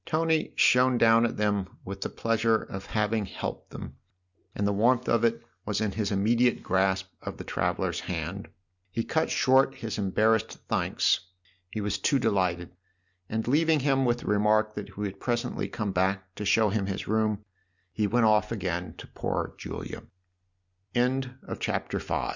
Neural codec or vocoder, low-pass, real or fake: none; 7.2 kHz; real